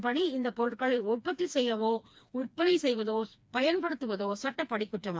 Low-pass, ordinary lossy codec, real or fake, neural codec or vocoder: none; none; fake; codec, 16 kHz, 2 kbps, FreqCodec, smaller model